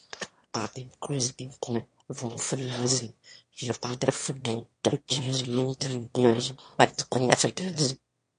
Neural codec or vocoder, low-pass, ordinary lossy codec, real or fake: autoencoder, 22.05 kHz, a latent of 192 numbers a frame, VITS, trained on one speaker; 9.9 kHz; MP3, 48 kbps; fake